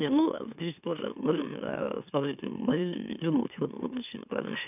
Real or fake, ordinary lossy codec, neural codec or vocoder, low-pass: fake; none; autoencoder, 44.1 kHz, a latent of 192 numbers a frame, MeloTTS; 3.6 kHz